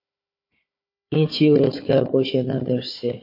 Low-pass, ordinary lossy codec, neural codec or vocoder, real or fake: 5.4 kHz; MP3, 32 kbps; codec, 16 kHz, 4 kbps, FunCodec, trained on Chinese and English, 50 frames a second; fake